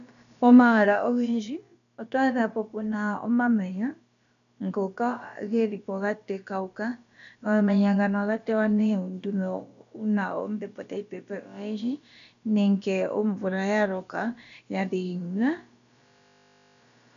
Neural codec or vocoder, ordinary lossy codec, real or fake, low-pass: codec, 16 kHz, about 1 kbps, DyCAST, with the encoder's durations; AAC, 96 kbps; fake; 7.2 kHz